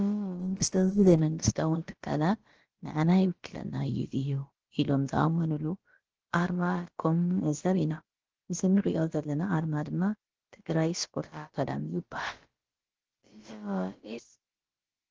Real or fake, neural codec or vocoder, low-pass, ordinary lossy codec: fake; codec, 16 kHz, about 1 kbps, DyCAST, with the encoder's durations; 7.2 kHz; Opus, 16 kbps